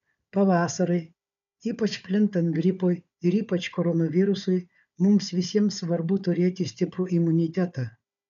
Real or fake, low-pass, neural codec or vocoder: fake; 7.2 kHz; codec, 16 kHz, 4 kbps, FunCodec, trained on Chinese and English, 50 frames a second